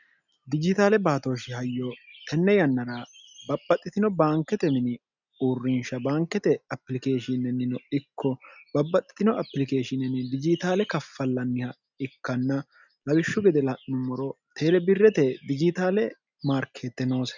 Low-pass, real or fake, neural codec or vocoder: 7.2 kHz; real; none